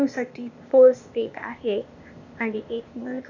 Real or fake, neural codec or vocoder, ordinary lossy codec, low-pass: fake; codec, 16 kHz, 0.8 kbps, ZipCodec; none; 7.2 kHz